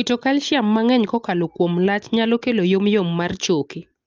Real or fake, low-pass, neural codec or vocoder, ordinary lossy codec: real; 7.2 kHz; none; Opus, 24 kbps